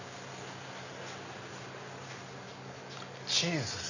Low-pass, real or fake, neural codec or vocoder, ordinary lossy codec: 7.2 kHz; real; none; none